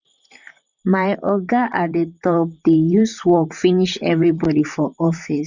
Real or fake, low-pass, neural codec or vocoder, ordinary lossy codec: fake; 7.2 kHz; vocoder, 44.1 kHz, 128 mel bands, Pupu-Vocoder; none